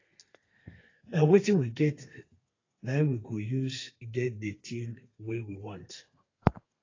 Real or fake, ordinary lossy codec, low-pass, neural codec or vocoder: fake; AAC, 32 kbps; 7.2 kHz; codec, 32 kHz, 1.9 kbps, SNAC